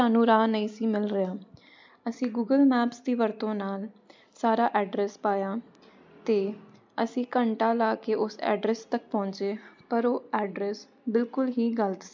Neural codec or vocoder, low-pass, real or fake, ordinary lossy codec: none; 7.2 kHz; real; MP3, 64 kbps